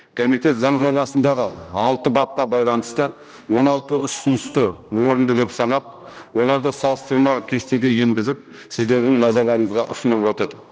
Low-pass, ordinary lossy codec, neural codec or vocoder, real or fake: none; none; codec, 16 kHz, 1 kbps, X-Codec, HuBERT features, trained on general audio; fake